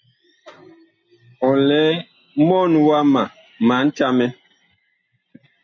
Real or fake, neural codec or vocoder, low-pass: real; none; 7.2 kHz